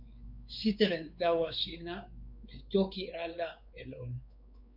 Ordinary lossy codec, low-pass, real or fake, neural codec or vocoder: MP3, 48 kbps; 5.4 kHz; fake; codec, 16 kHz, 4 kbps, X-Codec, WavLM features, trained on Multilingual LibriSpeech